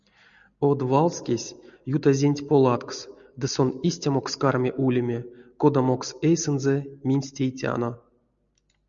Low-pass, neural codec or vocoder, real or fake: 7.2 kHz; none; real